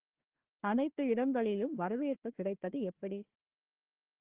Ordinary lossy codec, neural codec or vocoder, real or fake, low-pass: Opus, 16 kbps; codec, 16 kHz, 1 kbps, FunCodec, trained on Chinese and English, 50 frames a second; fake; 3.6 kHz